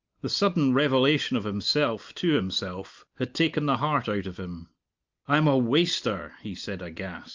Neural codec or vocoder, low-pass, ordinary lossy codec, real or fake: none; 7.2 kHz; Opus, 24 kbps; real